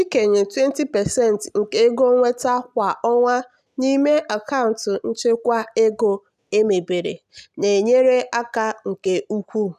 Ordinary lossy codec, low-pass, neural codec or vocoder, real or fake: none; 14.4 kHz; none; real